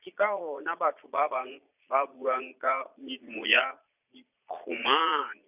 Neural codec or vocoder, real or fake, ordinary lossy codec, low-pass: vocoder, 22.05 kHz, 80 mel bands, Vocos; fake; none; 3.6 kHz